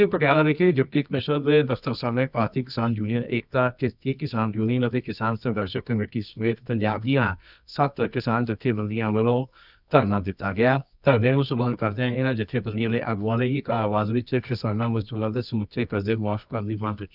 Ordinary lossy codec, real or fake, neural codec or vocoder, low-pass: none; fake; codec, 24 kHz, 0.9 kbps, WavTokenizer, medium music audio release; 5.4 kHz